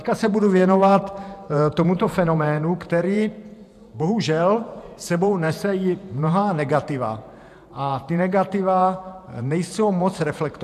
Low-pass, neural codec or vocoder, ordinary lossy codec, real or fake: 14.4 kHz; vocoder, 48 kHz, 128 mel bands, Vocos; MP3, 96 kbps; fake